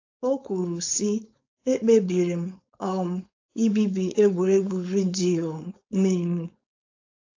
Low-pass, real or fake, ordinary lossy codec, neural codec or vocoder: 7.2 kHz; fake; AAC, 48 kbps; codec, 16 kHz, 4.8 kbps, FACodec